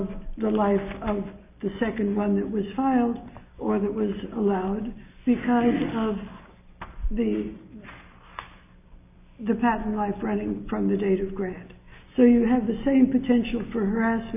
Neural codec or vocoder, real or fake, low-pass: none; real; 3.6 kHz